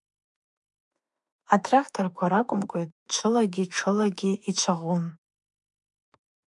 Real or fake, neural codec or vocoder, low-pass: fake; autoencoder, 48 kHz, 32 numbers a frame, DAC-VAE, trained on Japanese speech; 10.8 kHz